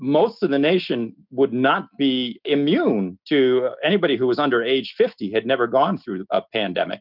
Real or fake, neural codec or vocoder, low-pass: real; none; 5.4 kHz